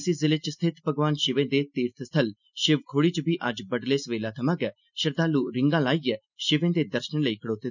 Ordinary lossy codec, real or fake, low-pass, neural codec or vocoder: none; real; 7.2 kHz; none